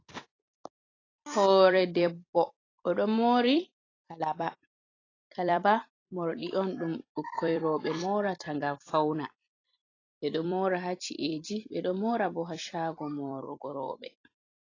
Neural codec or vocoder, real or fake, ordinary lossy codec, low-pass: vocoder, 44.1 kHz, 128 mel bands every 256 samples, BigVGAN v2; fake; AAC, 32 kbps; 7.2 kHz